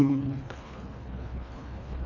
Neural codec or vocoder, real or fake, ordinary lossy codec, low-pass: codec, 24 kHz, 1.5 kbps, HILCodec; fake; none; 7.2 kHz